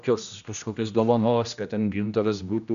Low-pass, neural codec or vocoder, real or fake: 7.2 kHz; codec, 16 kHz, 1 kbps, X-Codec, HuBERT features, trained on general audio; fake